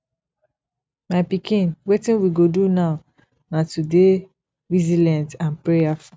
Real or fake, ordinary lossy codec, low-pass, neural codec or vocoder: real; none; none; none